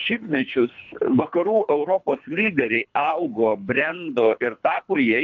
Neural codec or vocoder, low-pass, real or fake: codec, 24 kHz, 3 kbps, HILCodec; 7.2 kHz; fake